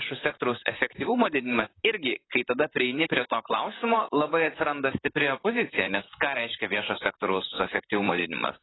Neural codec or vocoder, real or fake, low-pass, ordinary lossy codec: vocoder, 44.1 kHz, 128 mel bands, Pupu-Vocoder; fake; 7.2 kHz; AAC, 16 kbps